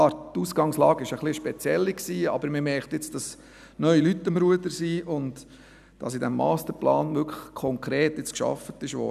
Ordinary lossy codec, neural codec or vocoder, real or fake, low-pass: none; none; real; 14.4 kHz